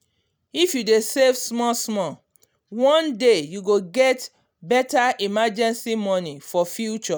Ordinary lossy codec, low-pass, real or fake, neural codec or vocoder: none; none; real; none